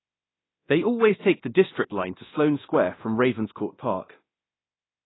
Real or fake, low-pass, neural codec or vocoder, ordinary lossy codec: fake; 7.2 kHz; codec, 24 kHz, 0.9 kbps, DualCodec; AAC, 16 kbps